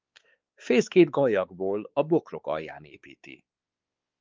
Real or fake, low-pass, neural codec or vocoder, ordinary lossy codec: fake; 7.2 kHz; codec, 16 kHz, 4 kbps, X-Codec, WavLM features, trained on Multilingual LibriSpeech; Opus, 24 kbps